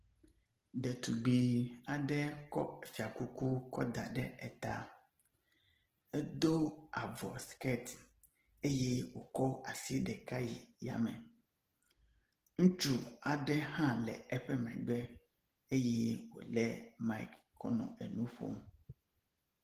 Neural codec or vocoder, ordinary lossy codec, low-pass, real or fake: none; Opus, 16 kbps; 14.4 kHz; real